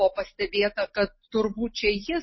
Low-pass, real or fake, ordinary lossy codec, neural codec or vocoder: 7.2 kHz; real; MP3, 24 kbps; none